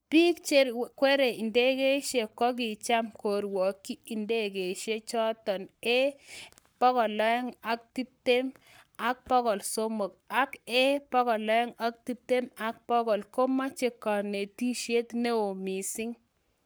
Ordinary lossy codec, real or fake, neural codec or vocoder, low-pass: none; fake; codec, 44.1 kHz, 7.8 kbps, Pupu-Codec; none